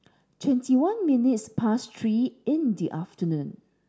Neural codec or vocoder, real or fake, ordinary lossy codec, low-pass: none; real; none; none